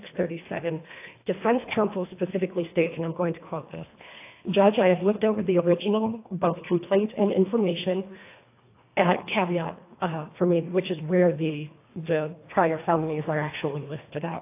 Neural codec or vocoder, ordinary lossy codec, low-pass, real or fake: codec, 24 kHz, 1.5 kbps, HILCodec; AAC, 24 kbps; 3.6 kHz; fake